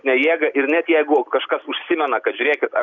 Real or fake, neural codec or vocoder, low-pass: real; none; 7.2 kHz